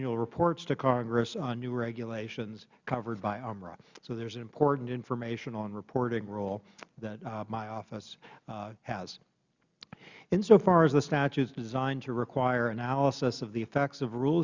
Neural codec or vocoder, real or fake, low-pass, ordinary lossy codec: none; real; 7.2 kHz; Opus, 64 kbps